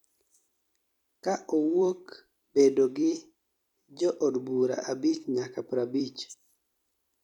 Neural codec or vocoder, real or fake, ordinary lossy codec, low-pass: vocoder, 44.1 kHz, 128 mel bands every 256 samples, BigVGAN v2; fake; none; 19.8 kHz